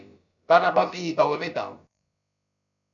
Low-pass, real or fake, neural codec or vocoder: 7.2 kHz; fake; codec, 16 kHz, about 1 kbps, DyCAST, with the encoder's durations